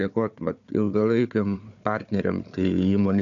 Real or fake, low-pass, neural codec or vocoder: fake; 7.2 kHz; codec, 16 kHz, 4 kbps, FunCodec, trained on Chinese and English, 50 frames a second